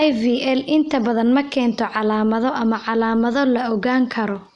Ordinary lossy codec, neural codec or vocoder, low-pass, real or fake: none; none; 10.8 kHz; real